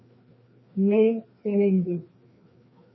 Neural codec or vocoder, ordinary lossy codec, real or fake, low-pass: codec, 16 kHz, 2 kbps, FreqCodec, larger model; MP3, 24 kbps; fake; 7.2 kHz